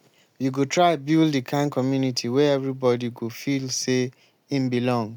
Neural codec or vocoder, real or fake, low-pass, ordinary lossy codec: none; real; 19.8 kHz; none